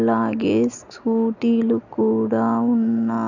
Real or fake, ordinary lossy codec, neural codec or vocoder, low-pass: real; none; none; 7.2 kHz